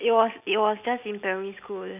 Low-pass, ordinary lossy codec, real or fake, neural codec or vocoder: 3.6 kHz; none; real; none